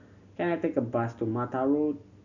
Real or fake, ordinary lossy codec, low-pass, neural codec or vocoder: real; none; 7.2 kHz; none